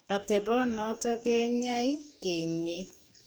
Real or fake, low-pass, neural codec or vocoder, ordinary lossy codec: fake; none; codec, 44.1 kHz, 2.6 kbps, DAC; none